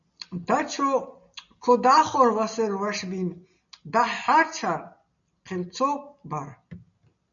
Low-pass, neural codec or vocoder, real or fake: 7.2 kHz; none; real